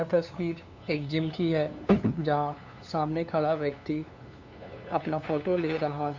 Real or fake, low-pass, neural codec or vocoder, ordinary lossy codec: fake; 7.2 kHz; codec, 16 kHz, 2 kbps, FunCodec, trained on LibriTTS, 25 frames a second; MP3, 64 kbps